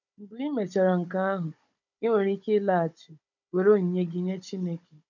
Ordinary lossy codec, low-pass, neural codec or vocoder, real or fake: MP3, 64 kbps; 7.2 kHz; codec, 16 kHz, 16 kbps, FunCodec, trained on Chinese and English, 50 frames a second; fake